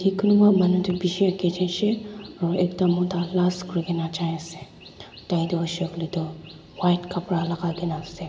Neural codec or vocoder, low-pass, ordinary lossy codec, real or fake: none; none; none; real